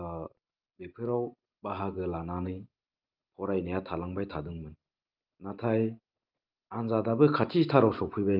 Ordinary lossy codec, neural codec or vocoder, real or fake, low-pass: Opus, 24 kbps; none; real; 5.4 kHz